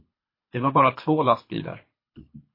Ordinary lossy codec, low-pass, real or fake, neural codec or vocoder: MP3, 24 kbps; 5.4 kHz; fake; codec, 24 kHz, 3 kbps, HILCodec